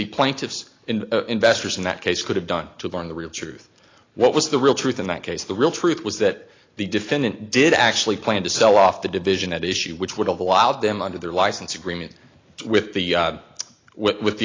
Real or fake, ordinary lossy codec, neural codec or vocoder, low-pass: real; AAC, 32 kbps; none; 7.2 kHz